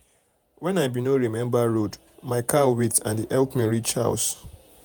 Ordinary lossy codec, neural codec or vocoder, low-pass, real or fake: none; vocoder, 48 kHz, 128 mel bands, Vocos; none; fake